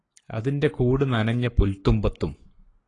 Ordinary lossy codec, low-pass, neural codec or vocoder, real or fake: AAC, 32 kbps; 10.8 kHz; codec, 44.1 kHz, 7.8 kbps, Pupu-Codec; fake